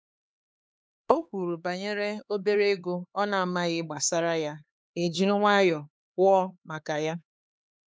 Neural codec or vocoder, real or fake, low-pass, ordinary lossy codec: codec, 16 kHz, 4 kbps, X-Codec, HuBERT features, trained on LibriSpeech; fake; none; none